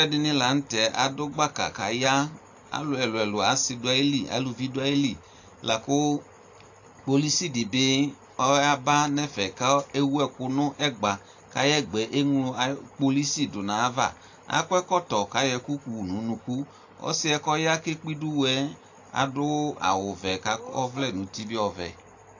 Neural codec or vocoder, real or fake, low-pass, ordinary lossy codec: none; real; 7.2 kHz; AAC, 48 kbps